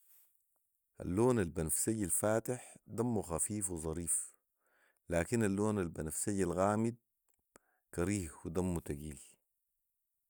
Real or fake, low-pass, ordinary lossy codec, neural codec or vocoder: real; none; none; none